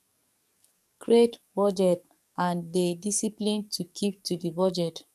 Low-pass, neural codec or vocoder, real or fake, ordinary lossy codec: 14.4 kHz; codec, 44.1 kHz, 7.8 kbps, DAC; fake; none